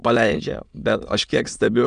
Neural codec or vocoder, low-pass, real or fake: autoencoder, 22.05 kHz, a latent of 192 numbers a frame, VITS, trained on many speakers; 9.9 kHz; fake